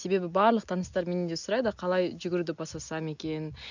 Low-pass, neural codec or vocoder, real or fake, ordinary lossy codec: 7.2 kHz; none; real; none